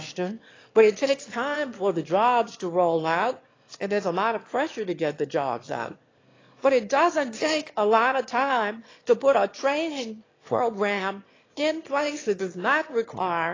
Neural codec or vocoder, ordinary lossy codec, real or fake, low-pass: autoencoder, 22.05 kHz, a latent of 192 numbers a frame, VITS, trained on one speaker; AAC, 32 kbps; fake; 7.2 kHz